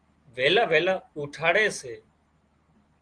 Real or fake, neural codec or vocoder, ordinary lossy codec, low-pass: real; none; Opus, 24 kbps; 9.9 kHz